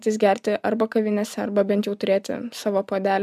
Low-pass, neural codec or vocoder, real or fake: 14.4 kHz; none; real